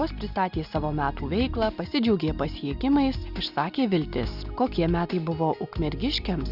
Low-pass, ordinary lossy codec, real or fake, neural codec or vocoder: 5.4 kHz; Opus, 64 kbps; real; none